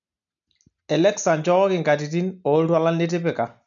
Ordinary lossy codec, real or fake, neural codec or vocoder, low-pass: none; real; none; 7.2 kHz